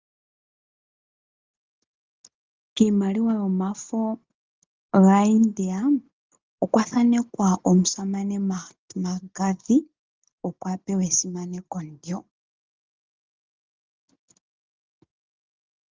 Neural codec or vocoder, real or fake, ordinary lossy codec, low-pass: none; real; Opus, 24 kbps; 7.2 kHz